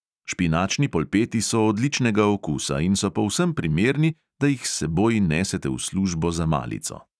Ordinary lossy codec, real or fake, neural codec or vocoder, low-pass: none; real; none; none